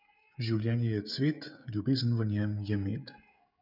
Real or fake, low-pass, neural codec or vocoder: fake; 5.4 kHz; codec, 16 kHz, 16 kbps, FreqCodec, smaller model